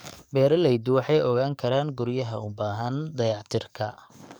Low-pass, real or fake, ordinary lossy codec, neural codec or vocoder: none; fake; none; codec, 44.1 kHz, 7.8 kbps, DAC